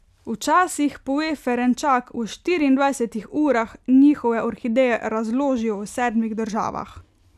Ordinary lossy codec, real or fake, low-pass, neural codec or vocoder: none; real; 14.4 kHz; none